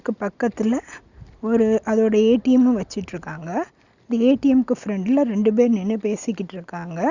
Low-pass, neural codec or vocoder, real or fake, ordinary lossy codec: 7.2 kHz; none; real; Opus, 64 kbps